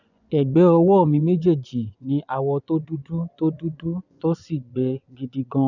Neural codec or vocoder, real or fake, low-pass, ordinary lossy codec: vocoder, 24 kHz, 100 mel bands, Vocos; fake; 7.2 kHz; none